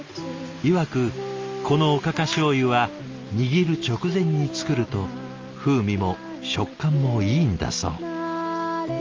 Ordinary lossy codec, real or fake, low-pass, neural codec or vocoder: Opus, 32 kbps; real; 7.2 kHz; none